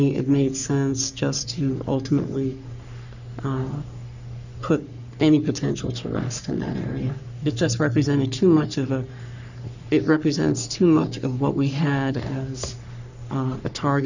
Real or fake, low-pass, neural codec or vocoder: fake; 7.2 kHz; codec, 44.1 kHz, 3.4 kbps, Pupu-Codec